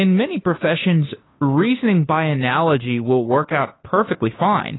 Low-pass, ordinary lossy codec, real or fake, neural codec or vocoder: 7.2 kHz; AAC, 16 kbps; fake; codec, 16 kHz, 2 kbps, FunCodec, trained on Chinese and English, 25 frames a second